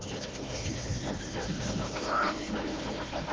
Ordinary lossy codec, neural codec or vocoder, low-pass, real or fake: Opus, 16 kbps; codec, 16 kHz, 1 kbps, FunCodec, trained on Chinese and English, 50 frames a second; 7.2 kHz; fake